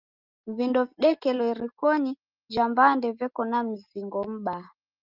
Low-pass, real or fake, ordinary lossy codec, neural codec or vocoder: 5.4 kHz; real; Opus, 24 kbps; none